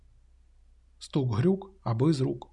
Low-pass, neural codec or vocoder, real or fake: 10.8 kHz; none; real